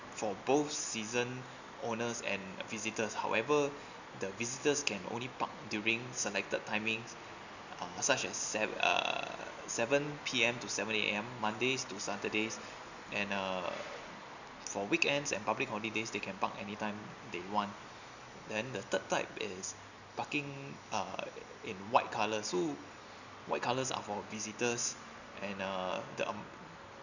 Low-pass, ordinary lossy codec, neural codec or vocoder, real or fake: 7.2 kHz; none; none; real